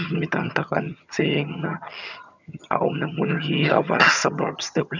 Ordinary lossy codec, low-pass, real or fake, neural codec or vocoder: none; 7.2 kHz; fake; vocoder, 22.05 kHz, 80 mel bands, HiFi-GAN